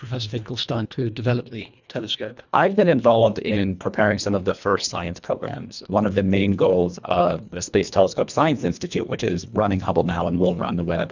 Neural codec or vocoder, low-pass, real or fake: codec, 24 kHz, 1.5 kbps, HILCodec; 7.2 kHz; fake